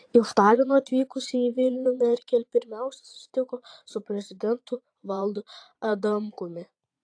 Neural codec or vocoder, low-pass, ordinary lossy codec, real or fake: vocoder, 22.05 kHz, 80 mel bands, Vocos; 9.9 kHz; AAC, 48 kbps; fake